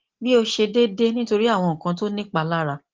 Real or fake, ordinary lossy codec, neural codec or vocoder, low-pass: real; Opus, 16 kbps; none; 7.2 kHz